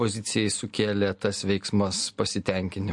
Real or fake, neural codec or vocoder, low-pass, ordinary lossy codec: real; none; 10.8 kHz; MP3, 48 kbps